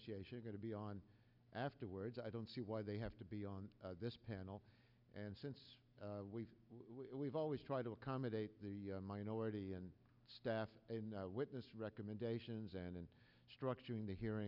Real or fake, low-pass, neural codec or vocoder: real; 5.4 kHz; none